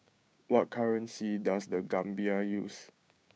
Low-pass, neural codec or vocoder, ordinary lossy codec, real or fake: none; codec, 16 kHz, 6 kbps, DAC; none; fake